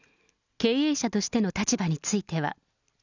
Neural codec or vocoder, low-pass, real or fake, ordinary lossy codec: none; 7.2 kHz; real; none